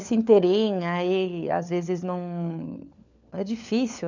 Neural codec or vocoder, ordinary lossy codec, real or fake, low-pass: codec, 16 kHz, 4 kbps, FunCodec, trained on LibriTTS, 50 frames a second; none; fake; 7.2 kHz